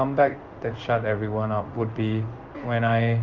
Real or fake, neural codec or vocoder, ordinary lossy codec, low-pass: fake; codec, 16 kHz in and 24 kHz out, 1 kbps, XY-Tokenizer; Opus, 24 kbps; 7.2 kHz